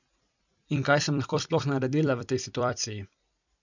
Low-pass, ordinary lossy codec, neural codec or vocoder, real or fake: 7.2 kHz; none; vocoder, 44.1 kHz, 128 mel bands every 256 samples, BigVGAN v2; fake